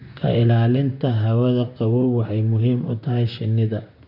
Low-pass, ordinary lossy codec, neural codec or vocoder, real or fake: 5.4 kHz; none; vocoder, 44.1 kHz, 128 mel bands, Pupu-Vocoder; fake